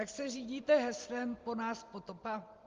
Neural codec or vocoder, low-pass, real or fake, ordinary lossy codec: none; 7.2 kHz; real; Opus, 32 kbps